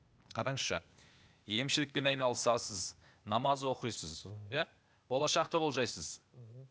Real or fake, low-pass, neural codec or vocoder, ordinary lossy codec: fake; none; codec, 16 kHz, 0.8 kbps, ZipCodec; none